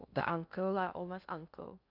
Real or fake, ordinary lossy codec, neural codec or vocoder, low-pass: fake; none; codec, 16 kHz in and 24 kHz out, 0.6 kbps, FocalCodec, streaming, 2048 codes; 5.4 kHz